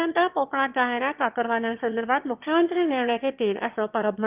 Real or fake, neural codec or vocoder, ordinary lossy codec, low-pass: fake; autoencoder, 22.05 kHz, a latent of 192 numbers a frame, VITS, trained on one speaker; Opus, 32 kbps; 3.6 kHz